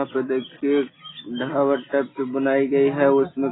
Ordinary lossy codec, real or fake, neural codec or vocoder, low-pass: AAC, 16 kbps; real; none; 7.2 kHz